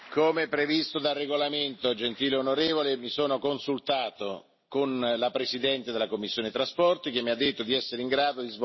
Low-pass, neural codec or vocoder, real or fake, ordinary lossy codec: 7.2 kHz; none; real; MP3, 24 kbps